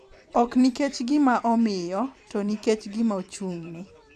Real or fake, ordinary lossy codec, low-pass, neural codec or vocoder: fake; none; 14.4 kHz; vocoder, 44.1 kHz, 128 mel bands every 256 samples, BigVGAN v2